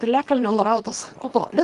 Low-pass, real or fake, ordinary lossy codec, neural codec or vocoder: 10.8 kHz; fake; Opus, 24 kbps; codec, 24 kHz, 0.9 kbps, WavTokenizer, small release